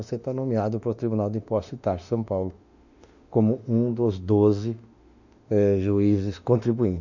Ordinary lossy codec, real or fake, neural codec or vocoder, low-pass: none; fake; autoencoder, 48 kHz, 32 numbers a frame, DAC-VAE, trained on Japanese speech; 7.2 kHz